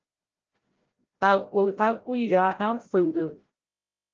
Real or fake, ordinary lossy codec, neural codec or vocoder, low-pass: fake; Opus, 16 kbps; codec, 16 kHz, 0.5 kbps, FreqCodec, larger model; 7.2 kHz